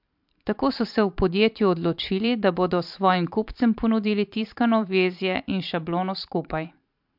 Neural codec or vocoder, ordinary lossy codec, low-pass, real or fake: vocoder, 44.1 kHz, 128 mel bands every 512 samples, BigVGAN v2; MP3, 48 kbps; 5.4 kHz; fake